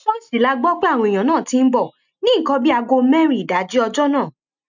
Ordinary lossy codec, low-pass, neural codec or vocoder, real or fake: none; 7.2 kHz; none; real